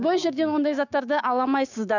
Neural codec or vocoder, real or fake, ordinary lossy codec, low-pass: codec, 16 kHz, 6 kbps, DAC; fake; none; 7.2 kHz